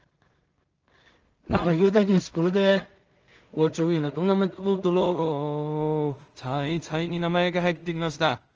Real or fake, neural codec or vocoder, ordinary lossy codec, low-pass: fake; codec, 16 kHz in and 24 kHz out, 0.4 kbps, LongCat-Audio-Codec, two codebook decoder; Opus, 24 kbps; 7.2 kHz